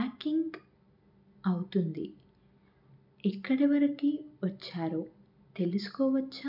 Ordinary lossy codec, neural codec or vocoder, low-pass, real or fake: none; none; 5.4 kHz; real